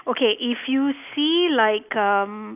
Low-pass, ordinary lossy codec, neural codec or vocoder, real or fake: 3.6 kHz; none; none; real